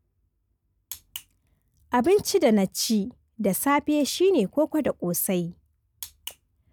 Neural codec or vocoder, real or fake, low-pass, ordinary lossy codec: none; real; none; none